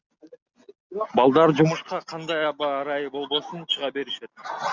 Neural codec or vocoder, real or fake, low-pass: none; real; 7.2 kHz